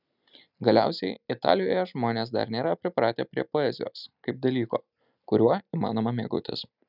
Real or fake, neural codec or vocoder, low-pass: real; none; 5.4 kHz